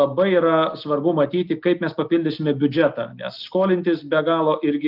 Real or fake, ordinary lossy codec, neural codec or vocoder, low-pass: real; Opus, 24 kbps; none; 5.4 kHz